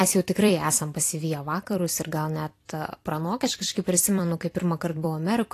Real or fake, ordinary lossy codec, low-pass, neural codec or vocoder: fake; AAC, 48 kbps; 14.4 kHz; vocoder, 44.1 kHz, 128 mel bands every 256 samples, BigVGAN v2